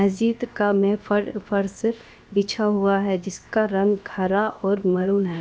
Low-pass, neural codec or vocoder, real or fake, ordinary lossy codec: none; codec, 16 kHz, 0.7 kbps, FocalCodec; fake; none